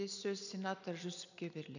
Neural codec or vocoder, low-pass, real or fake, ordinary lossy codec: none; 7.2 kHz; real; none